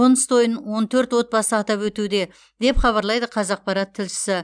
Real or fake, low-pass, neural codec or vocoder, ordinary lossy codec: real; 9.9 kHz; none; none